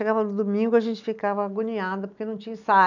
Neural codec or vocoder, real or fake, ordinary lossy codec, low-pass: none; real; none; 7.2 kHz